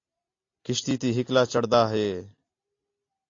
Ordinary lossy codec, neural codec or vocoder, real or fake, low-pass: AAC, 48 kbps; none; real; 7.2 kHz